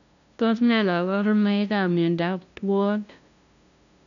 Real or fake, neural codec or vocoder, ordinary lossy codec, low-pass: fake; codec, 16 kHz, 0.5 kbps, FunCodec, trained on LibriTTS, 25 frames a second; none; 7.2 kHz